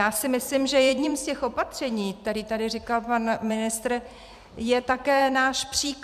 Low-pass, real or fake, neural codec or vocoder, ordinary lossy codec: 14.4 kHz; fake; vocoder, 44.1 kHz, 128 mel bands every 256 samples, BigVGAN v2; MP3, 96 kbps